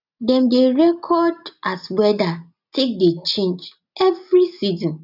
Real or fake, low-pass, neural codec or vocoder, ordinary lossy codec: real; 5.4 kHz; none; none